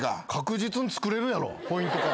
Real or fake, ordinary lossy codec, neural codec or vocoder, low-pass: real; none; none; none